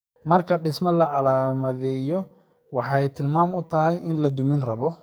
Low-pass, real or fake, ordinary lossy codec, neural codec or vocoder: none; fake; none; codec, 44.1 kHz, 2.6 kbps, SNAC